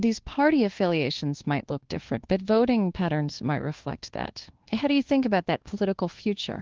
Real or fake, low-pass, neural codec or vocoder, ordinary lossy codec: fake; 7.2 kHz; codec, 24 kHz, 1.2 kbps, DualCodec; Opus, 16 kbps